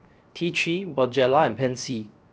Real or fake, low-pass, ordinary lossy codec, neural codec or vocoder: fake; none; none; codec, 16 kHz, 0.7 kbps, FocalCodec